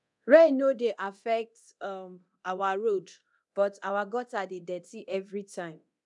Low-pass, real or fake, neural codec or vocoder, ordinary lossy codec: 10.8 kHz; fake; codec, 24 kHz, 0.9 kbps, DualCodec; none